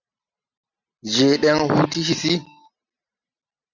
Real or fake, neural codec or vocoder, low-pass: real; none; 7.2 kHz